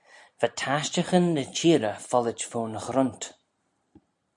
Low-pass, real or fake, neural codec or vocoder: 10.8 kHz; real; none